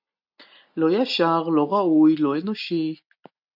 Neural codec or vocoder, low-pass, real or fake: none; 5.4 kHz; real